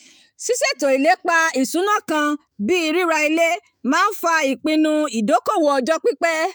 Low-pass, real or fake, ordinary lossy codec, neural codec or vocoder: none; fake; none; autoencoder, 48 kHz, 128 numbers a frame, DAC-VAE, trained on Japanese speech